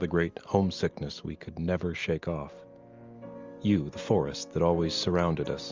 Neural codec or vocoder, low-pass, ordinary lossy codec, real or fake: none; 7.2 kHz; Opus, 32 kbps; real